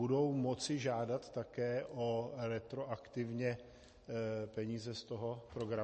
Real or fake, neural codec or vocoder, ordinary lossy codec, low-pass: real; none; MP3, 32 kbps; 10.8 kHz